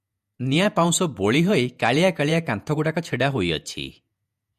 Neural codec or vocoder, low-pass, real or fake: vocoder, 48 kHz, 128 mel bands, Vocos; 14.4 kHz; fake